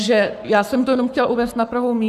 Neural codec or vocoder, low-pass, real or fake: codec, 44.1 kHz, 7.8 kbps, Pupu-Codec; 14.4 kHz; fake